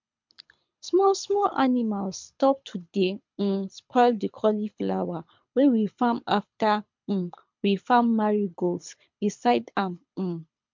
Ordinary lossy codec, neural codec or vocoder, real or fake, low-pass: MP3, 64 kbps; codec, 24 kHz, 6 kbps, HILCodec; fake; 7.2 kHz